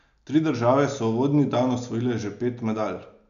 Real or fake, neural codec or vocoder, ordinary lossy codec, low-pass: real; none; none; 7.2 kHz